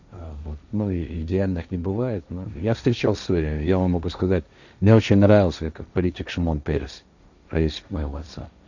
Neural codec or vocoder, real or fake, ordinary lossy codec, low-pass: codec, 16 kHz, 1.1 kbps, Voila-Tokenizer; fake; none; 7.2 kHz